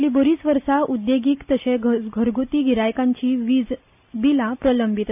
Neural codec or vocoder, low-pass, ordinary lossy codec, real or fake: none; 3.6 kHz; none; real